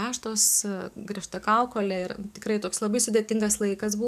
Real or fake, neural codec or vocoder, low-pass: fake; codec, 44.1 kHz, 7.8 kbps, DAC; 14.4 kHz